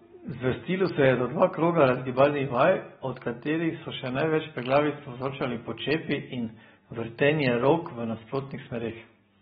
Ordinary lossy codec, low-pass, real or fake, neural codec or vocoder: AAC, 16 kbps; 19.8 kHz; real; none